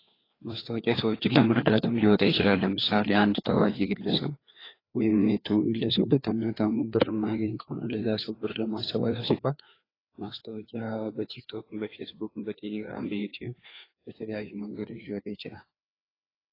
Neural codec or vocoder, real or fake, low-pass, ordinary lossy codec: codec, 16 kHz, 2 kbps, FreqCodec, larger model; fake; 5.4 kHz; AAC, 24 kbps